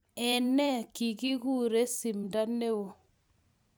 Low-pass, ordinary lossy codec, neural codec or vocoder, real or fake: none; none; vocoder, 44.1 kHz, 128 mel bands every 512 samples, BigVGAN v2; fake